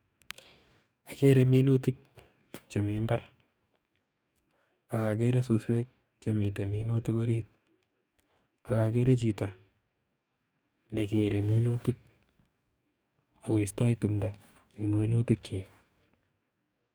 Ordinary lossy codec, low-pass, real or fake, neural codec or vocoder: none; none; fake; codec, 44.1 kHz, 2.6 kbps, DAC